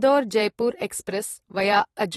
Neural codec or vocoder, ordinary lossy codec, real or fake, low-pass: vocoder, 44.1 kHz, 128 mel bands every 512 samples, BigVGAN v2; AAC, 32 kbps; fake; 19.8 kHz